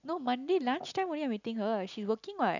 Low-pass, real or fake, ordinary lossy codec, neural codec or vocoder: 7.2 kHz; real; none; none